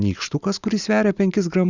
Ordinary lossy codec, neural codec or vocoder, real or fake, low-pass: Opus, 64 kbps; none; real; 7.2 kHz